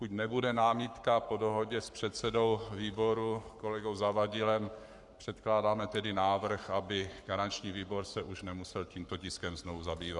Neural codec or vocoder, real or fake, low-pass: codec, 44.1 kHz, 7.8 kbps, Pupu-Codec; fake; 10.8 kHz